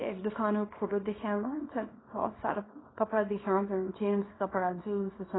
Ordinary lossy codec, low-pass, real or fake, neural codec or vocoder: AAC, 16 kbps; 7.2 kHz; fake; codec, 24 kHz, 0.9 kbps, WavTokenizer, small release